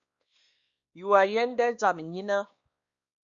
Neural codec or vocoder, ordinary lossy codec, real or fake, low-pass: codec, 16 kHz, 2 kbps, X-Codec, WavLM features, trained on Multilingual LibriSpeech; Opus, 64 kbps; fake; 7.2 kHz